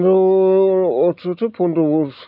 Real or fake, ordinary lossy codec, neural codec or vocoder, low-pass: real; none; none; 5.4 kHz